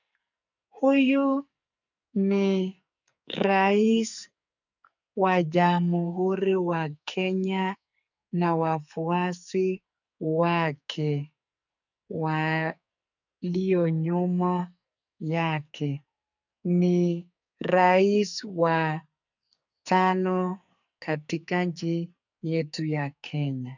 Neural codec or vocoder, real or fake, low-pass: codec, 44.1 kHz, 2.6 kbps, SNAC; fake; 7.2 kHz